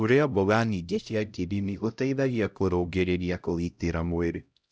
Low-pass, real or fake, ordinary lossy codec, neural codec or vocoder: none; fake; none; codec, 16 kHz, 0.5 kbps, X-Codec, HuBERT features, trained on LibriSpeech